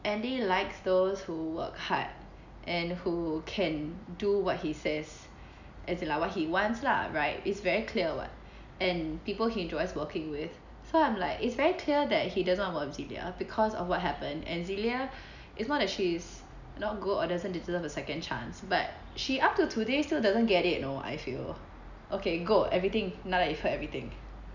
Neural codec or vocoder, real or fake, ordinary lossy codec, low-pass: none; real; none; 7.2 kHz